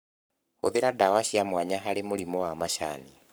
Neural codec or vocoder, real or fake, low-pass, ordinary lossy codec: codec, 44.1 kHz, 7.8 kbps, Pupu-Codec; fake; none; none